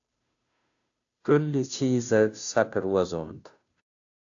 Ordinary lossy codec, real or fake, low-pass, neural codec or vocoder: AAC, 64 kbps; fake; 7.2 kHz; codec, 16 kHz, 0.5 kbps, FunCodec, trained on Chinese and English, 25 frames a second